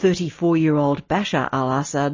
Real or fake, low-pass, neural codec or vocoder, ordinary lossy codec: real; 7.2 kHz; none; MP3, 32 kbps